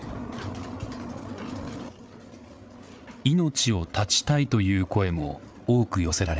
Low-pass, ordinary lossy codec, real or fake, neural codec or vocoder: none; none; fake; codec, 16 kHz, 8 kbps, FreqCodec, larger model